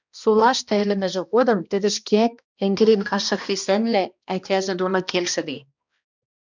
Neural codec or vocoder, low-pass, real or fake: codec, 16 kHz, 1 kbps, X-Codec, HuBERT features, trained on balanced general audio; 7.2 kHz; fake